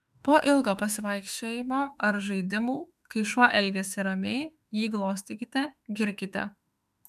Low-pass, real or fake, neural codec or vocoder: 14.4 kHz; fake; autoencoder, 48 kHz, 32 numbers a frame, DAC-VAE, trained on Japanese speech